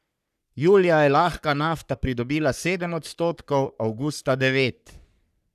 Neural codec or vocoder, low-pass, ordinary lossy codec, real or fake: codec, 44.1 kHz, 3.4 kbps, Pupu-Codec; 14.4 kHz; none; fake